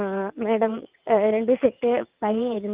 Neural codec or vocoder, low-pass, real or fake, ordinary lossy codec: vocoder, 22.05 kHz, 80 mel bands, WaveNeXt; 3.6 kHz; fake; Opus, 24 kbps